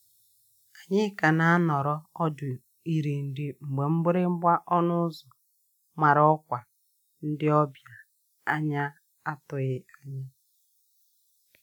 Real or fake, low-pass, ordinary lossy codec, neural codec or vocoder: real; 19.8 kHz; none; none